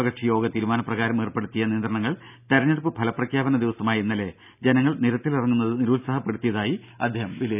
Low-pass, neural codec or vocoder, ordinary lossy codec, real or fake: 3.6 kHz; none; none; real